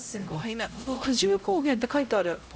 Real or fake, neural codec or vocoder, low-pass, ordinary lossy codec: fake; codec, 16 kHz, 0.5 kbps, X-Codec, HuBERT features, trained on LibriSpeech; none; none